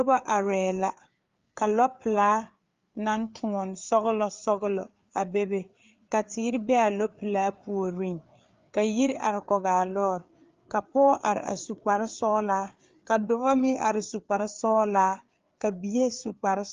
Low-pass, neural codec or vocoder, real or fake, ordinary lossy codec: 7.2 kHz; codec, 16 kHz, 4 kbps, FreqCodec, larger model; fake; Opus, 32 kbps